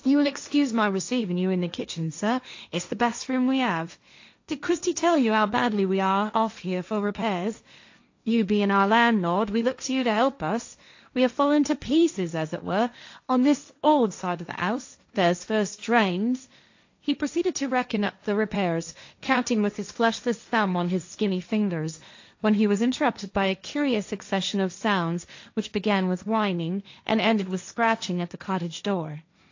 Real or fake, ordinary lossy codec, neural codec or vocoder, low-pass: fake; AAC, 48 kbps; codec, 16 kHz, 1.1 kbps, Voila-Tokenizer; 7.2 kHz